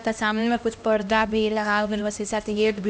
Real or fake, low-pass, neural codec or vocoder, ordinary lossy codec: fake; none; codec, 16 kHz, 1 kbps, X-Codec, HuBERT features, trained on LibriSpeech; none